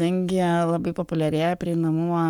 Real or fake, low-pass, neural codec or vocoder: fake; 19.8 kHz; codec, 44.1 kHz, 7.8 kbps, Pupu-Codec